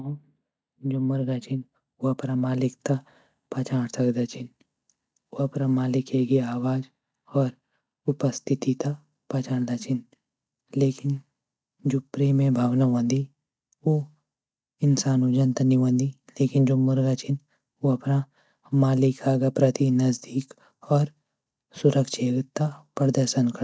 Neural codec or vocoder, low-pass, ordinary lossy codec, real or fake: none; none; none; real